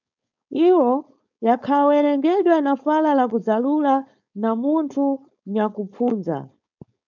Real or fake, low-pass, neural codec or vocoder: fake; 7.2 kHz; codec, 16 kHz, 4.8 kbps, FACodec